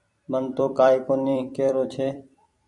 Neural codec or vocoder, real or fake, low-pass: vocoder, 44.1 kHz, 128 mel bands every 256 samples, BigVGAN v2; fake; 10.8 kHz